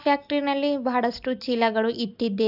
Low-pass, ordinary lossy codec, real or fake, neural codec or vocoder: 5.4 kHz; AAC, 48 kbps; real; none